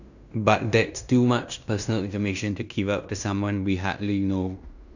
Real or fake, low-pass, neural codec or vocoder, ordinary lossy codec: fake; 7.2 kHz; codec, 16 kHz in and 24 kHz out, 0.9 kbps, LongCat-Audio-Codec, fine tuned four codebook decoder; none